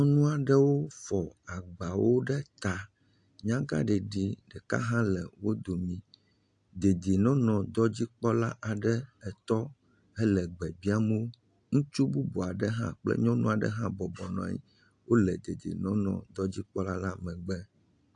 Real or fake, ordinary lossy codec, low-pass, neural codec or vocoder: real; AAC, 64 kbps; 9.9 kHz; none